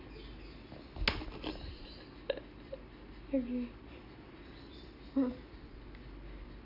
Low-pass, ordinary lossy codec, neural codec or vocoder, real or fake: 5.4 kHz; none; none; real